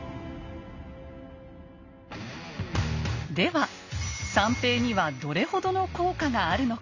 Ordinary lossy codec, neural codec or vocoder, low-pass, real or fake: none; none; 7.2 kHz; real